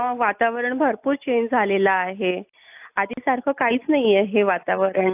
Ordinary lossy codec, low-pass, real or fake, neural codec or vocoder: AAC, 32 kbps; 3.6 kHz; real; none